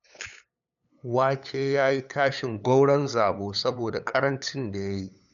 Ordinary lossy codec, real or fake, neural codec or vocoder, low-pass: none; fake; codec, 16 kHz, 8 kbps, FunCodec, trained on LibriTTS, 25 frames a second; 7.2 kHz